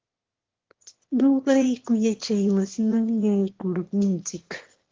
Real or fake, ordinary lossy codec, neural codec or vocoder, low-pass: fake; Opus, 16 kbps; autoencoder, 22.05 kHz, a latent of 192 numbers a frame, VITS, trained on one speaker; 7.2 kHz